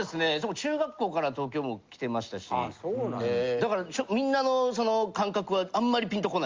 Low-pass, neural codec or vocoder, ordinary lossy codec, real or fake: 7.2 kHz; none; Opus, 32 kbps; real